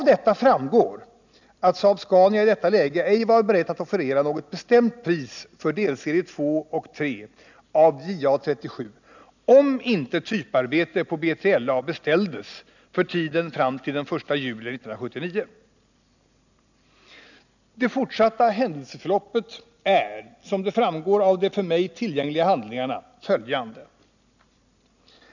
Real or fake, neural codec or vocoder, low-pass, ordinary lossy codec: real; none; 7.2 kHz; none